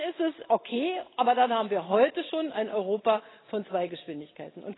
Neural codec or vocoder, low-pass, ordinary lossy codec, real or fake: none; 7.2 kHz; AAC, 16 kbps; real